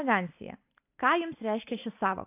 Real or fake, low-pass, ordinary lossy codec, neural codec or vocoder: real; 3.6 kHz; AAC, 24 kbps; none